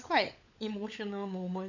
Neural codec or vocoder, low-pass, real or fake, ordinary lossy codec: codec, 16 kHz, 16 kbps, FunCodec, trained on LibriTTS, 50 frames a second; 7.2 kHz; fake; none